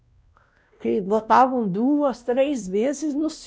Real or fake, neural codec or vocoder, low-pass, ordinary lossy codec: fake; codec, 16 kHz, 1 kbps, X-Codec, WavLM features, trained on Multilingual LibriSpeech; none; none